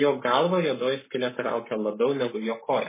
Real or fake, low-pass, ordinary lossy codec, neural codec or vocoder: fake; 3.6 kHz; MP3, 16 kbps; codec, 44.1 kHz, 7.8 kbps, Pupu-Codec